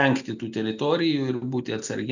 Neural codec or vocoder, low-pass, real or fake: none; 7.2 kHz; real